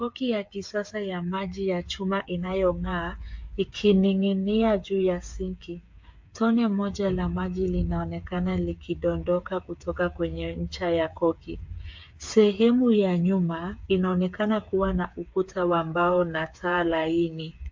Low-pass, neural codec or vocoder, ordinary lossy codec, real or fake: 7.2 kHz; codec, 16 kHz, 8 kbps, FreqCodec, smaller model; MP3, 48 kbps; fake